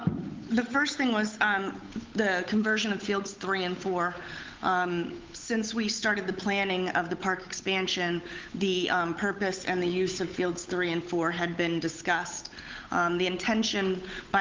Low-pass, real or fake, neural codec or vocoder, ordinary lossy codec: 7.2 kHz; fake; codec, 16 kHz, 8 kbps, FunCodec, trained on Chinese and English, 25 frames a second; Opus, 24 kbps